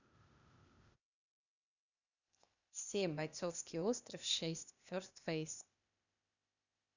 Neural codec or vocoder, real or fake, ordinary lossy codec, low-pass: codec, 16 kHz, 0.8 kbps, ZipCodec; fake; none; 7.2 kHz